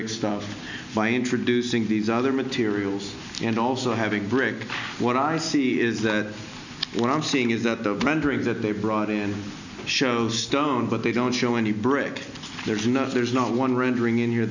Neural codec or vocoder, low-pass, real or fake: none; 7.2 kHz; real